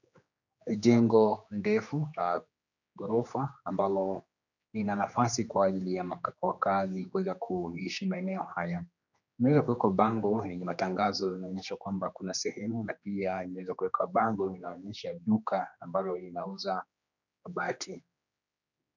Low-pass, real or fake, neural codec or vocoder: 7.2 kHz; fake; codec, 16 kHz, 2 kbps, X-Codec, HuBERT features, trained on general audio